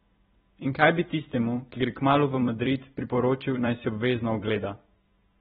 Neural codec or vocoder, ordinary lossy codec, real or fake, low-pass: vocoder, 44.1 kHz, 128 mel bands every 256 samples, BigVGAN v2; AAC, 16 kbps; fake; 19.8 kHz